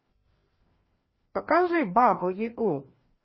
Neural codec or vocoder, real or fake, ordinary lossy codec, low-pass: codec, 16 kHz, 1 kbps, FreqCodec, larger model; fake; MP3, 24 kbps; 7.2 kHz